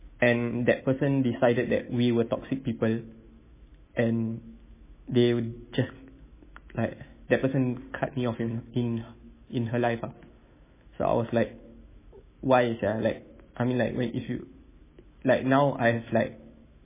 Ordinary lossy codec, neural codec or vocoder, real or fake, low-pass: MP3, 16 kbps; autoencoder, 48 kHz, 128 numbers a frame, DAC-VAE, trained on Japanese speech; fake; 3.6 kHz